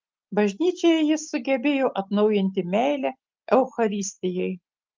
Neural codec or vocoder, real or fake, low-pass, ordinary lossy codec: none; real; 7.2 kHz; Opus, 32 kbps